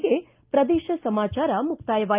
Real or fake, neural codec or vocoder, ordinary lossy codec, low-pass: real; none; Opus, 32 kbps; 3.6 kHz